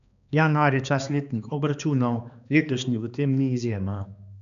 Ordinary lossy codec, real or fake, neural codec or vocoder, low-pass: none; fake; codec, 16 kHz, 2 kbps, X-Codec, HuBERT features, trained on balanced general audio; 7.2 kHz